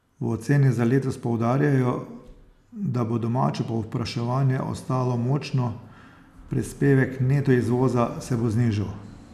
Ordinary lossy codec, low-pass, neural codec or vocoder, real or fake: none; 14.4 kHz; none; real